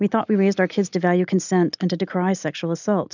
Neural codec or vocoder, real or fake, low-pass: none; real; 7.2 kHz